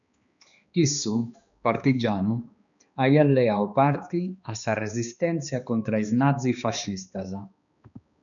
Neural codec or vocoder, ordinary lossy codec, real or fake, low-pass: codec, 16 kHz, 2 kbps, X-Codec, HuBERT features, trained on balanced general audio; MP3, 96 kbps; fake; 7.2 kHz